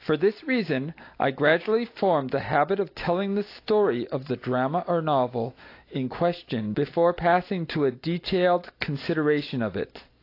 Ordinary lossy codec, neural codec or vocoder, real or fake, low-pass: AAC, 32 kbps; none; real; 5.4 kHz